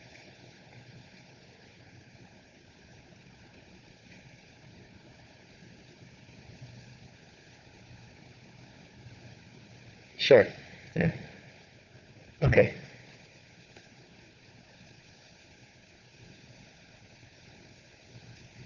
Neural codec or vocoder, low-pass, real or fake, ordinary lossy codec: codec, 16 kHz, 4 kbps, FunCodec, trained on Chinese and English, 50 frames a second; 7.2 kHz; fake; none